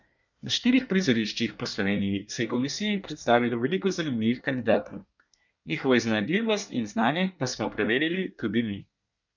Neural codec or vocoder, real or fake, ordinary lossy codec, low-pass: codec, 24 kHz, 1 kbps, SNAC; fake; none; 7.2 kHz